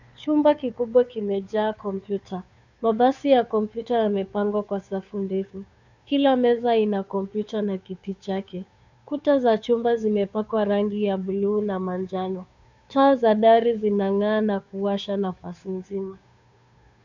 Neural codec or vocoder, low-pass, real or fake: codec, 16 kHz, 2 kbps, FunCodec, trained on Chinese and English, 25 frames a second; 7.2 kHz; fake